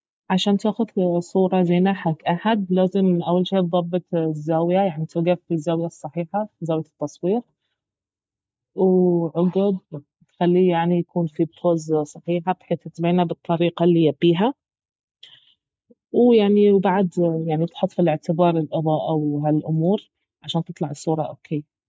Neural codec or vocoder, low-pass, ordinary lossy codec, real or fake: none; none; none; real